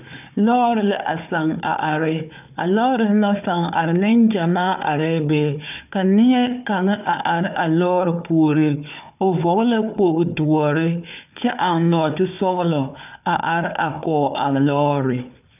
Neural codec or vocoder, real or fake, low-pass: codec, 16 kHz, 4 kbps, FunCodec, trained on Chinese and English, 50 frames a second; fake; 3.6 kHz